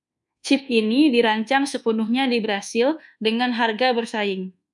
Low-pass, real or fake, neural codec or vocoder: 10.8 kHz; fake; codec, 24 kHz, 1.2 kbps, DualCodec